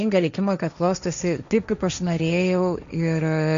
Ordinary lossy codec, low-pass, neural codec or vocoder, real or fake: MP3, 64 kbps; 7.2 kHz; codec, 16 kHz, 1.1 kbps, Voila-Tokenizer; fake